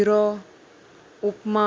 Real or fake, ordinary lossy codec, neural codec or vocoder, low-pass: real; none; none; none